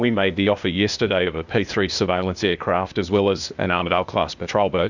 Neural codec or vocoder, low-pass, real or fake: codec, 16 kHz, 0.8 kbps, ZipCodec; 7.2 kHz; fake